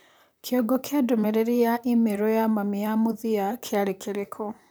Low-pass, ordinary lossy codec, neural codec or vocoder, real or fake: none; none; vocoder, 44.1 kHz, 128 mel bands, Pupu-Vocoder; fake